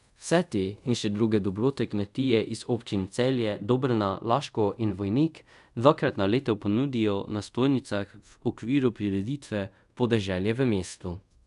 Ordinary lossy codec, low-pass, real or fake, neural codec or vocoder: none; 10.8 kHz; fake; codec, 24 kHz, 0.5 kbps, DualCodec